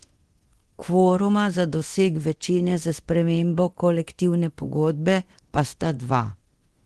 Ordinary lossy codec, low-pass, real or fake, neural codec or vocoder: Opus, 16 kbps; 10.8 kHz; fake; codec, 24 kHz, 0.9 kbps, DualCodec